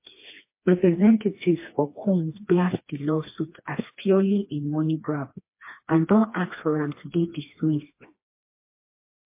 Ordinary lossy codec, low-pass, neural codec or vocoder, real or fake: MP3, 24 kbps; 3.6 kHz; codec, 16 kHz, 2 kbps, FreqCodec, smaller model; fake